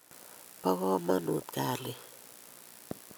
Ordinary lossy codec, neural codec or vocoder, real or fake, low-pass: none; none; real; none